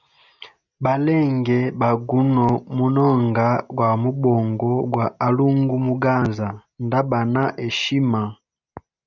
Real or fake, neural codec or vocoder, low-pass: real; none; 7.2 kHz